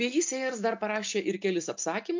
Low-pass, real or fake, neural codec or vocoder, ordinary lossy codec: 7.2 kHz; real; none; MP3, 64 kbps